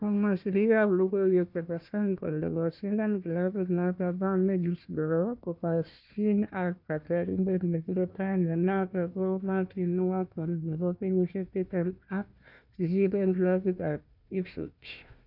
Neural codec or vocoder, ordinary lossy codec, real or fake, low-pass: codec, 16 kHz, 1 kbps, FunCodec, trained on Chinese and English, 50 frames a second; none; fake; 5.4 kHz